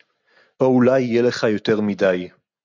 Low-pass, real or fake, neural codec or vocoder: 7.2 kHz; real; none